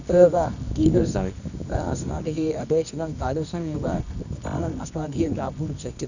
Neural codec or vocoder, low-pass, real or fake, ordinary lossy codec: codec, 24 kHz, 0.9 kbps, WavTokenizer, medium music audio release; 7.2 kHz; fake; none